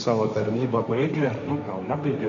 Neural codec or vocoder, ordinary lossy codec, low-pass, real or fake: codec, 16 kHz, 1.1 kbps, Voila-Tokenizer; MP3, 64 kbps; 7.2 kHz; fake